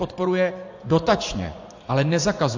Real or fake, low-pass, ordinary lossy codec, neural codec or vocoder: fake; 7.2 kHz; AAC, 48 kbps; vocoder, 24 kHz, 100 mel bands, Vocos